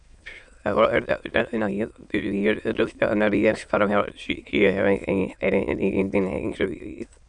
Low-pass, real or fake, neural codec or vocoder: 9.9 kHz; fake; autoencoder, 22.05 kHz, a latent of 192 numbers a frame, VITS, trained on many speakers